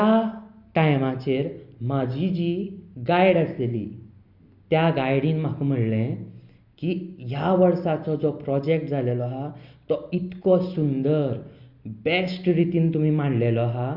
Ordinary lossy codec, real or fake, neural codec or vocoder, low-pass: Opus, 64 kbps; real; none; 5.4 kHz